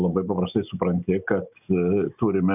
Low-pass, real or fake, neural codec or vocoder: 3.6 kHz; real; none